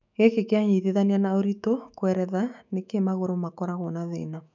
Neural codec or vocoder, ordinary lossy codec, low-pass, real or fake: autoencoder, 48 kHz, 128 numbers a frame, DAC-VAE, trained on Japanese speech; none; 7.2 kHz; fake